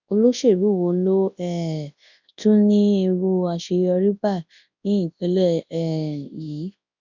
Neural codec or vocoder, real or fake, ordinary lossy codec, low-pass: codec, 24 kHz, 0.9 kbps, WavTokenizer, large speech release; fake; none; 7.2 kHz